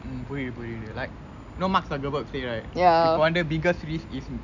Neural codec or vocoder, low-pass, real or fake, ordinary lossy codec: none; 7.2 kHz; real; none